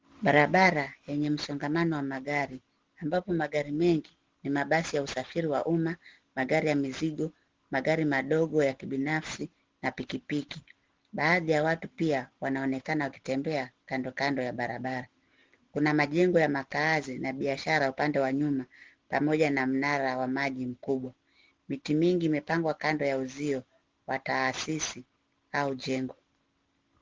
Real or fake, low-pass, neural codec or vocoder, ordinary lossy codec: real; 7.2 kHz; none; Opus, 16 kbps